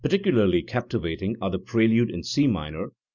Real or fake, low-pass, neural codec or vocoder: real; 7.2 kHz; none